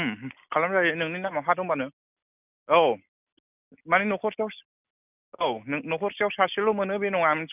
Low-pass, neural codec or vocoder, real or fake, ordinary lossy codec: 3.6 kHz; none; real; none